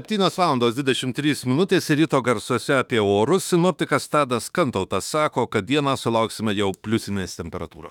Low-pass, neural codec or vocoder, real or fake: 19.8 kHz; autoencoder, 48 kHz, 32 numbers a frame, DAC-VAE, trained on Japanese speech; fake